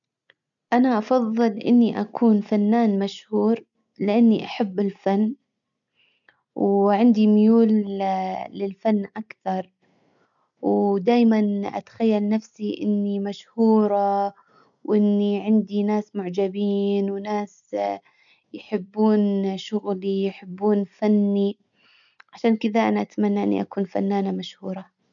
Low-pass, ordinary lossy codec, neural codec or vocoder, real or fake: 7.2 kHz; none; none; real